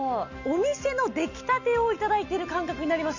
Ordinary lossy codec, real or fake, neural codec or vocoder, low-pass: none; real; none; 7.2 kHz